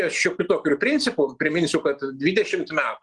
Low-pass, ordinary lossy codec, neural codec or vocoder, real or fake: 10.8 kHz; Opus, 24 kbps; none; real